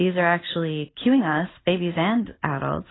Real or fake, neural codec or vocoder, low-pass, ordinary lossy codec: real; none; 7.2 kHz; AAC, 16 kbps